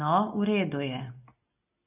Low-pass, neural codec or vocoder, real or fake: 3.6 kHz; none; real